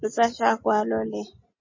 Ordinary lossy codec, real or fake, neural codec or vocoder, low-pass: MP3, 32 kbps; real; none; 7.2 kHz